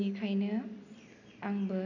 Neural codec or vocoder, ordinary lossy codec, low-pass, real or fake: none; none; 7.2 kHz; real